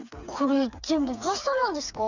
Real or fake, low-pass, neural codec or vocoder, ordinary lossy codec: fake; 7.2 kHz; codec, 16 kHz, 4 kbps, FreqCodec, smaller model; none